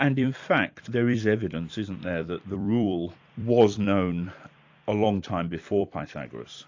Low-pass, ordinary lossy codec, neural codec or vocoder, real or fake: 7.2 kHz; AAC, 48 kbps; vocoder, 44.1 kHz, 128 mel bands every 256 samples, BigVGAN v2; fake